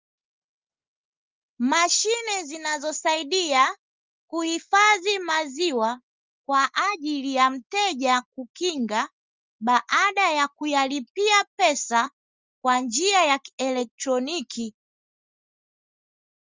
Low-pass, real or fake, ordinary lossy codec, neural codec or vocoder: 7.2 kHz; real; Opus, 32 kbps; none